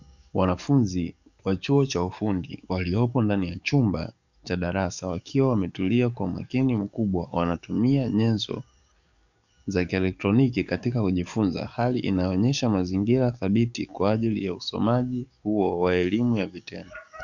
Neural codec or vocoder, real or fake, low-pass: codec, 44.1 kHz, 7.8 kbps, DAC; fake; 7.2 kHz